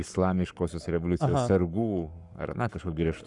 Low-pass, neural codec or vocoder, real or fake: 10.8 kHz; codec, 44.1 kHz, 7.8 kbps, Pupu-Codec; fake